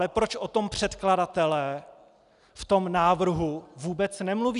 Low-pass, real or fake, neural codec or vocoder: 10.8 kHz; real; none